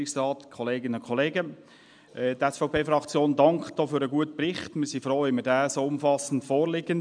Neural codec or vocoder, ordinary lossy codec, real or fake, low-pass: none; MP3, 96 kbps; real; 9.9 kHz